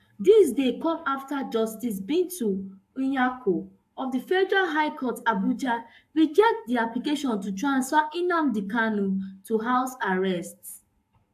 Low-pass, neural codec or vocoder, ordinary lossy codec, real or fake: 14.4 kHz; codec, 44.1 kHz, 7.8 kbps, Pupu-Codec; AAC, 96 kbps; fake